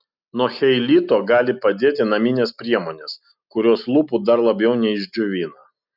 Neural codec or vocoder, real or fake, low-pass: none; real; 5.4 kHz